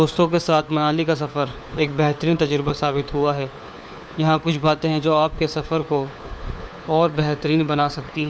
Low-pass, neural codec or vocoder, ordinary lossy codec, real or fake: none; codec, 16 kHz, 4 kbps, FunCodec, trained on LibriTTS, 50 frames a second; none; fake